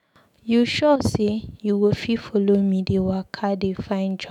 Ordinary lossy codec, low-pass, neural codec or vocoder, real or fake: none; 19.8 kHz; autoencoder, 48 kHz, 128 numbers a frame, DAC-VAE, trained on Japanese speech; fake